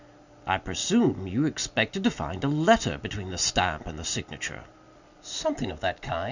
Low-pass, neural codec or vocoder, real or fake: 7.2 kHz; none; real